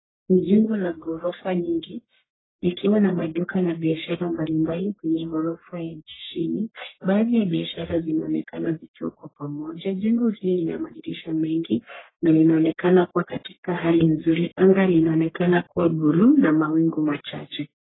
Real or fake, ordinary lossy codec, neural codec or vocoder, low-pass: fake; AAC, 16 kbps; codec, 44.1 kHz, 1.7 kbps, Pupu-Codec; 7.2 kHz